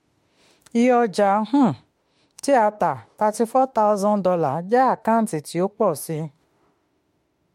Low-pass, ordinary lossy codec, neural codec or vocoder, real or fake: 19.8 kHz; MP3, 64 kbps; autoencoder, 48 kHz, 32 numbers a frame, DAC-VAE, trained on Japanese speech; fake